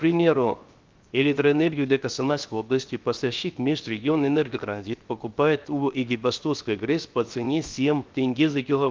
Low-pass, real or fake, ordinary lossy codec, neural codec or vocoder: 7.2 kHz; fake; Opus, 32 kbps; codec, 16 kHz, 0.7 kbps, FocalCodec